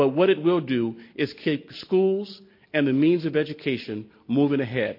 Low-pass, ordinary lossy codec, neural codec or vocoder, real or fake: 5.4 kHz; MP3, 32 kbps; none; real